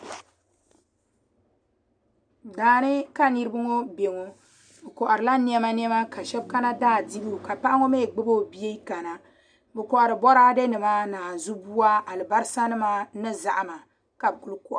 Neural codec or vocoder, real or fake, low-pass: none; real; 9.9 kHz